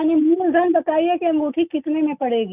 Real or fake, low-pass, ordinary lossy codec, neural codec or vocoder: real; 3.6 kHz; none; none